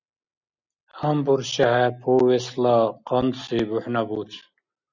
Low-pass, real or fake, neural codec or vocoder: 7.2 kHz; real; none